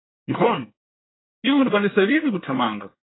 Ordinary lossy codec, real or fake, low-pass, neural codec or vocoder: AAC, 16 kbps; fake; 7.2 kHz; codec, 24 kHz, 1 kbps, SNAC